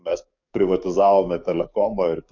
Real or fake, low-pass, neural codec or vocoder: fake; 7.2 kHz; codec, 44.1 kHz, 7.8 kbps, DAC